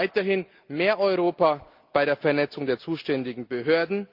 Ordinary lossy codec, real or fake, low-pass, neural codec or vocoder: Opus, 32 kbps; real; 5.4 kHz; none